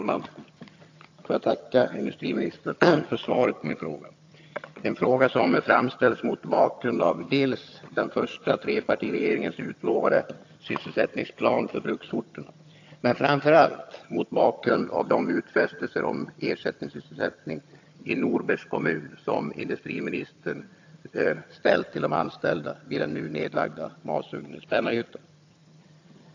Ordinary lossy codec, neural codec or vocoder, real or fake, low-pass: AAC, 48 kbps; vocoder, 22.05 kHz, 80 mel bands, HiFi-GAN; fake; 7.2 kHz